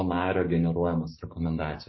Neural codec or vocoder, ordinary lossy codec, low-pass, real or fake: codec, 16 kHz, 6 kbps, DAC; MP3, 24 kbps; 7.2 kHz; fake